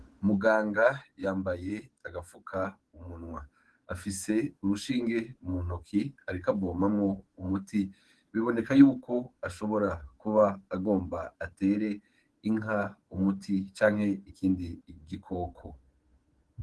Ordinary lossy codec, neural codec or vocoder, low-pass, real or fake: Opus, 16 kbps; codec, 24 kHz, 3.1 kbps, DualCodec; 10.8 kHz; fake